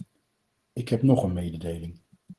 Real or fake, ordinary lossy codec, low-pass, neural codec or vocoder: real; Opus, 16 kbps; 10.8 kHz; none